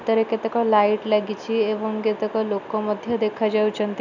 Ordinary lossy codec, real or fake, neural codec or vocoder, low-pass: none; real; none; 7.2 kHz